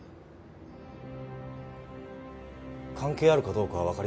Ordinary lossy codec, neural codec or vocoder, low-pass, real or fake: none; none; none; real